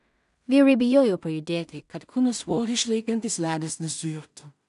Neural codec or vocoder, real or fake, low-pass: codec, 16 kHz in and 24 kHz out, 0.4 kbps, LongCat-Audio-Codec, two codebook decoder; fake; 10.8 kHz